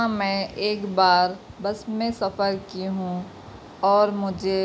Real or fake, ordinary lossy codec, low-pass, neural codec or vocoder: real; none; none; none